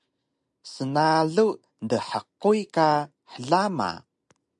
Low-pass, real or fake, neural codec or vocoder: 10.8 kHz; real; none